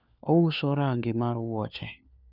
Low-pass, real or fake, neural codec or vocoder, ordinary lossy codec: 5.4 kHz; fake; codec, 16 kHz, 4 kbps, FunCodec, trained on LibriTTS, 50 frames a second; none